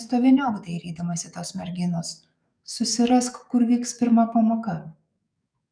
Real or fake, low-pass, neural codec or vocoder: fake; 9.9 kHz; codec, 44.1 kHz, 7.8 kbps, DAC